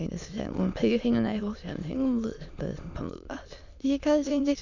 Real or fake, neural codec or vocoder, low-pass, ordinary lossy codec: fake; autoencoder, 22.05 kHz, a latent of 192 numbers a frame, VITS, trained on many speakers; 7.2 kHz; none